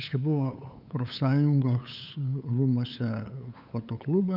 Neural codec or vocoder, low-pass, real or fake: codec, 16 kHz, 8 kbps, FunCodec, trained on LibriTTS, 25 frames a second; 5.4 kHz; fake